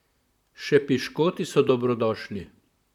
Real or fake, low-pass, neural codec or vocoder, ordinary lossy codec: fake; 19.8 kHz; vocoder, 44.1 kHz, 128 mel bands, Pupu-Vocoder; none